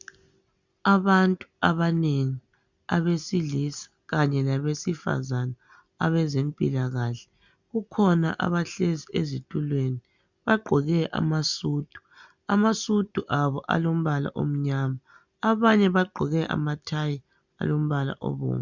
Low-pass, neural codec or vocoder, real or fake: 7.2 kHz; none; real